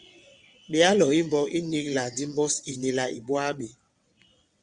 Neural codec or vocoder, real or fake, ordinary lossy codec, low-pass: vocoder, 22.05 kHz, 80 mel bands, WaveNeXt; fake; Opus, 64 kbps; 9.9 kHz